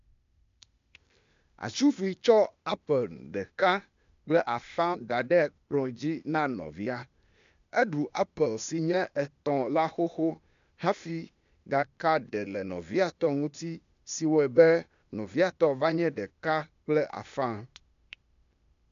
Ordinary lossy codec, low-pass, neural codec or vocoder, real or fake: MP3, 64 kbps; 7.2 kHz; codec, 16 kHz, 0.8 kbps, ZipCodec; fake